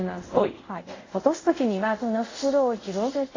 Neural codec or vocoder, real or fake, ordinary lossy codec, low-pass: codec, 24 kHz, 0.5 kbps, DualCodec; fake; AAC, 32 kbps; 7.2 kHz